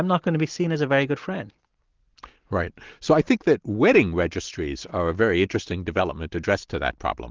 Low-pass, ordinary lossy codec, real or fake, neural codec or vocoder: 7.2 kHz; Opus, 16 kbps; real; none